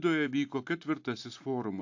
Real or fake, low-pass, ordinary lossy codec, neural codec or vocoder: real; 7.2 kHz; AAC, 48 kbps; none